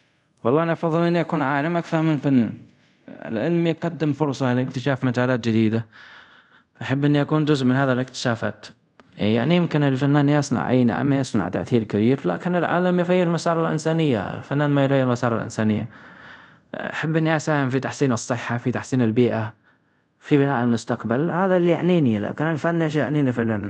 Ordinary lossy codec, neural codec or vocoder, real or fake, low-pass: none; codec, 24 kHz, 0.5 kbps, DualCodec; fake; 10.8 kHz